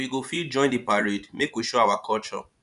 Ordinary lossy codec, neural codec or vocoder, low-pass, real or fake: none; vocoder, 24 kHz, 100 mel bands, Vocos; 10.8 kHz; fake